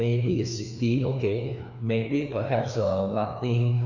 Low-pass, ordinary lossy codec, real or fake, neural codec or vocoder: 7.2 kHz; none; fake; codec, 16 kHz, 2 kbps, FreqCodec, larger model